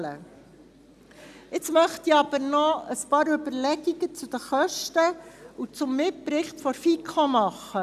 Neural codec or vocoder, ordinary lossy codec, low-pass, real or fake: none; none; 14.4 kHz; real